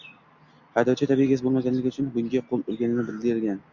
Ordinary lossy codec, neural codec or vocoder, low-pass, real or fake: Opus, 64 kbps; none; 7.2 kHz; real